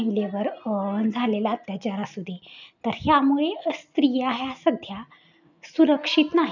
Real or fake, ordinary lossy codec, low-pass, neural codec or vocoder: real; none; 7.2 kHz; none